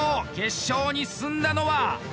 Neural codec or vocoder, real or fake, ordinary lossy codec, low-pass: none; real; none; none